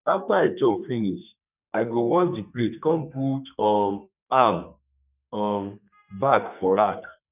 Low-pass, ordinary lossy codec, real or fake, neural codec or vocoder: 3.6 kHz; none; fake; codec, 32 kHz, 1.9 kbps, SNAC